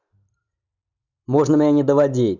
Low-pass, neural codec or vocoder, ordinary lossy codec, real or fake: 7.2 kHz; none; none; real